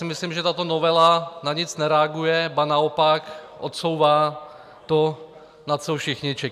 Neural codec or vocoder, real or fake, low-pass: none; real; 14.4 kHz